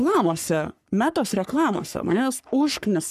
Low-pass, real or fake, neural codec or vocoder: 14.4 kHz; fake; codec, 44.1 kHz, 3.4 kbps, Pupu-Codec